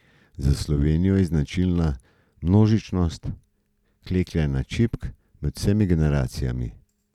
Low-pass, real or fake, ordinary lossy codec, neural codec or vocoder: 19.8 kHz; real; none; none